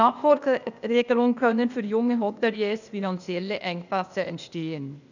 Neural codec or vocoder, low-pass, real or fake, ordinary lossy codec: codec, 16 kHz, 0.8 kbps, ZipCodec; 7.2 kHz; fake; none